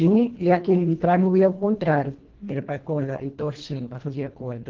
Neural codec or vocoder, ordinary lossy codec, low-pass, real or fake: codec, 24 kHz, 1.5 kbps, HILCodec; Opus, 16 kbps; 7.2 kHz; fake